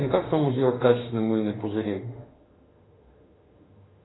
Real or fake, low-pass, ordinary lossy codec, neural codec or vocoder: fake; 7.2 kHz; AAC, 16 kbps; autoencoder, 48 kHz, 32 numbers a frame, DAC-VAE, trained on Japanese speech